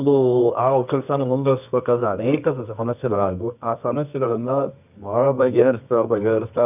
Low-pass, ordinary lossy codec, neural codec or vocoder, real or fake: 3.6 kHz; none; codec, 24 kHz, 0.9 kbps, WavTokenizer, medium music audio release; fake